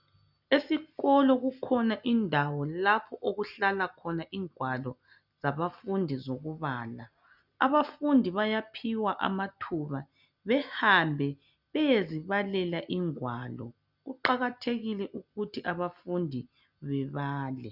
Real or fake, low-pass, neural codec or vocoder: real; 5.4 kHz; none